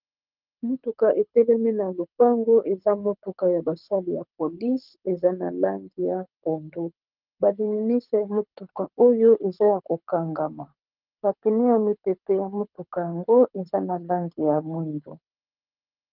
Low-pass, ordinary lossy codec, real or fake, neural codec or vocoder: 5.4 kHz; Opus, 24 kbps; fake; codec, 24 kHz, 6 kbps, HILCodec